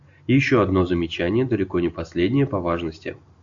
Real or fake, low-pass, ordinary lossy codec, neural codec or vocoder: real; 7.2 kHz; AAC, 64 kbps; none